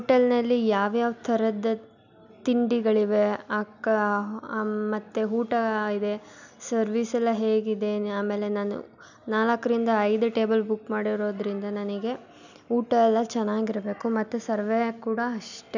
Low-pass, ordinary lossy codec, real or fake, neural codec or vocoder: 7.2 kHz; none; real; none